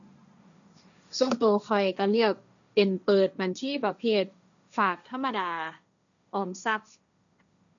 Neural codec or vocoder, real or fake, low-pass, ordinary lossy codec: codec, 16 kHz, 1.1 kbps, Voila-Tokenizer; fake; 7.2 kHz; none